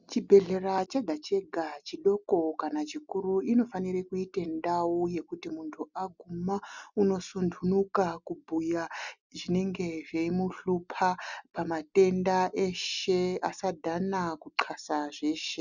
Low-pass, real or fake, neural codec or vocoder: 7.2 kHz; real; none